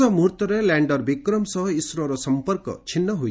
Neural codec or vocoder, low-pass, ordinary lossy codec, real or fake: none; none; none; real